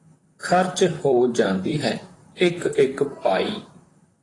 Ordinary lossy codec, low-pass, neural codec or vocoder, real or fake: AAC, 32 kbps; 10.8 kHz; vocoder, 44.1 kHz, 128 mel bands, Pupu-Vocoder; fake